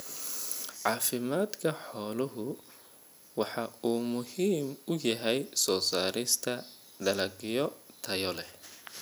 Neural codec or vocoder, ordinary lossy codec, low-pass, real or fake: none; none; none; real